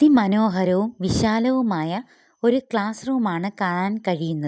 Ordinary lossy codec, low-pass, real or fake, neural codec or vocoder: none; none; real; none